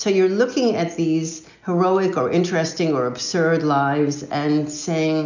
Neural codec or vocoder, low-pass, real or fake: none; 7.2 kHz; real